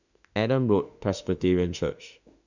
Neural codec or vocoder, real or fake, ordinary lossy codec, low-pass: autoencoder, 48 kHz, 32 numbers a frame, DAC-VAE, trained on Japanese speech; fake; none; 7.2 kHz